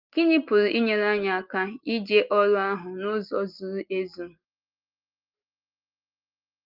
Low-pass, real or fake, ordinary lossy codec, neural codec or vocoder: 5.4 kHz; real; Opus, 24 kbps; none